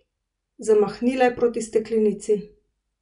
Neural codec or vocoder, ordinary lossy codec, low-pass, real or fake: none; none; 10.8 kHz; real